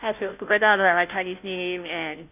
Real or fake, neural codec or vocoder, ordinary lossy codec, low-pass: fake; codec, 16 kHz, 0.5 kbps, FunCodec, trained on Chinese and English, 25 frames a second; none; 3.6 kHz